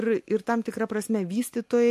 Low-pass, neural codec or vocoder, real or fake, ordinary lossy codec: 14.4 kHz; none; real; MP3, 64 kbps